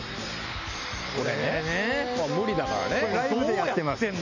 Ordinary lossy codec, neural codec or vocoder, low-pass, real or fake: none; none; 7.2 kHz; real